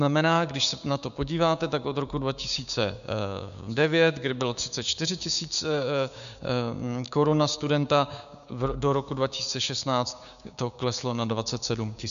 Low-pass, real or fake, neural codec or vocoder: 7.2 kHz; fake; codec, 16 kHz, 6 kbps, DAC